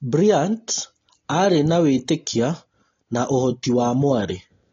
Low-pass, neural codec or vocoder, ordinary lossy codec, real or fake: 7.2 kHz; none; AAC, 32 kbps; real